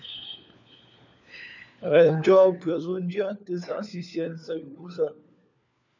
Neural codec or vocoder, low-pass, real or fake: codec, 16 kHz, 4 kbps, FunCodec, trained on LibriTTS, 50 frames a second; 7.2 kHz; fake